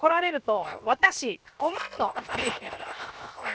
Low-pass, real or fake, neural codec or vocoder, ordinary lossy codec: none; fake; codec, 16 kHz, 0.7 kbps, FocalCodec; none